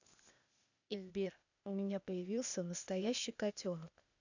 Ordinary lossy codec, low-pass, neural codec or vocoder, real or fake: none; 7.2 kHz; codec, 16 kHz, 0.8 kbps, ZipCodec; fake